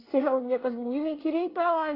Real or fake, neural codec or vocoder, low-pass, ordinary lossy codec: fake; codec, 24 kHz, 1 kbps, SNAC; 5.4 kHz; AAC, 24 kbps